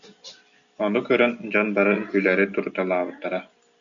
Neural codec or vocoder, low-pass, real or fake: none; 7.2 kHz; real